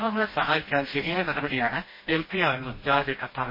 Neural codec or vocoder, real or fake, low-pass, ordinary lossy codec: codec, 16 kHz, 1 kbps, FreqCodec, smaller model; fake; 5.4 kHz; MP3, 24 kbps